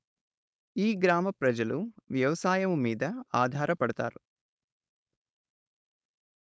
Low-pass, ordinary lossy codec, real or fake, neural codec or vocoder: none; none; fake; codec, 16 kHz, 4.8 kbps, FACodec